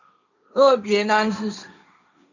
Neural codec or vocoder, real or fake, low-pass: codec, 16 kHz, 1.1 kbps, Voila-Tokenizer; fake; 7.2 kHz